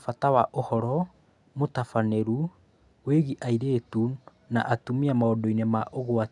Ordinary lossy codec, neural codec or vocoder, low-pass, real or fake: none; none; 10.8 kHz; real